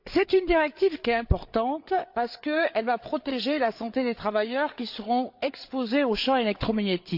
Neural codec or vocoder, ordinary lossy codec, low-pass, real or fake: codec, 16 kHz, 8 kbps, FreqCodec, larger model; AAC, 48 kbps; 5.4 kHz; fake